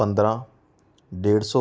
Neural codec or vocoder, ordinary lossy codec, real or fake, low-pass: none; none; real; none